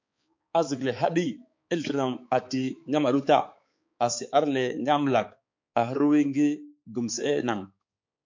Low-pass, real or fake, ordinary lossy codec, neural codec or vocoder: 7.2 kHz; fake; MP3, 48 kbps; codec, 16 kHz, 4 kbps, X-Codec, HuBERT features, trained on balanced general audio